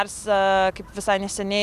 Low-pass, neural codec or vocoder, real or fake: 14.4 kHz; none; real